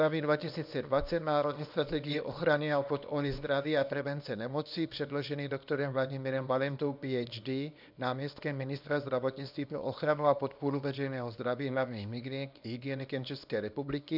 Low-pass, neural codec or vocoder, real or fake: 5.4 kHz; codec, 24 kHz, 0.9 kbps, WavTokenizer, small release; fake